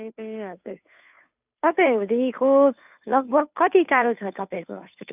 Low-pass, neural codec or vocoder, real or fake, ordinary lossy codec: 3.6 kHz; codec, 16 kHz, 2 kbps, FunCodec, trained on Chinese and English, 25 frames a second; fake; none